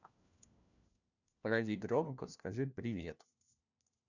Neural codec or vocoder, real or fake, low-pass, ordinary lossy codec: codec, 16 kHz, 1 kbps, FunCodec, trained on LibriTTS, 50 frames a second; fake; 7.2 kHz; none